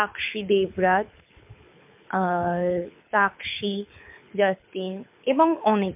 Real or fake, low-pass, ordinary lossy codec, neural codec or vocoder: fake; 3.6 kHz; MP3, 32 kbps; codec, 24 kHz, 6 kbps, HILCodec